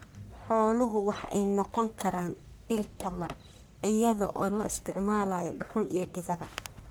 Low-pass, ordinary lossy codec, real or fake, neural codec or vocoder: none; none; fake; codec, 44.1 kHz, 1.7 kbps, Pupu-Codec